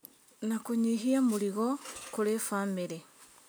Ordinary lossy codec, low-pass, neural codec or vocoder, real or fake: none; none; none; real